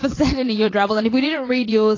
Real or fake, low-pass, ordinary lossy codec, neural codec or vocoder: fake; 7.2 kHz; AAC, 32 kbps; vocoder, 22.05 kHz, 80 mel bands, WaveNeXt